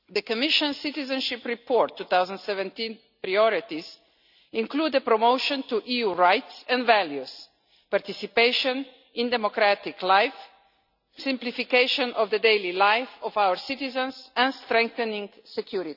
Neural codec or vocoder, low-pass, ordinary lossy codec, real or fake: none; 5.4 kHz; none; real